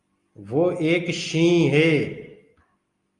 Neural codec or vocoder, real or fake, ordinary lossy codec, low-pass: none; real; Opus, 32 kbps; 10.8 kHz